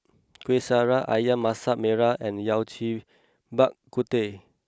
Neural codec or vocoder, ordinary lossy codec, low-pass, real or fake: none; none; none; real